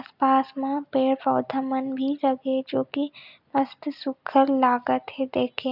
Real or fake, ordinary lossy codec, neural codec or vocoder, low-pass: real; none; none; 5.4 kHz